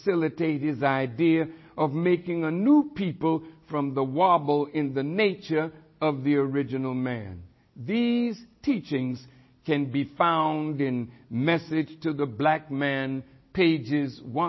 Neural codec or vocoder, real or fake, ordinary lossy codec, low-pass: none; real; MP3, 24 kbps; 7.2 kHz